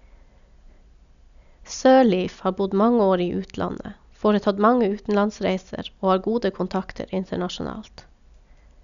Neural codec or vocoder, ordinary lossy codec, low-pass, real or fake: none; none; 7.2 kHz; real